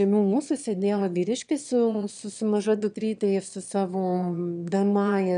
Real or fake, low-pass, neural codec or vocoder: fake; 9.9 kHz; autoencoder, 22.05 kHz, a latent of 192 numbers a frame, VITS, trained on one speaker